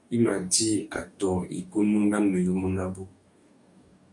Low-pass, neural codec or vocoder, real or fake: 10.8 kHz; codec, 44.1 kHz, 2.6 kbps, DAC; fake